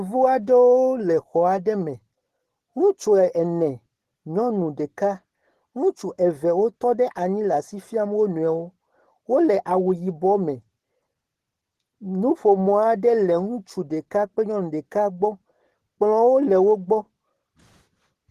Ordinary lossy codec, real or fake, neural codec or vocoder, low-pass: Opus, 16 kbps; real; none; 14.4 kHz